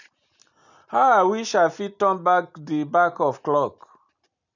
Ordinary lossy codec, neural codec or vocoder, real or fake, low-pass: none; none; real; 7.2 kHz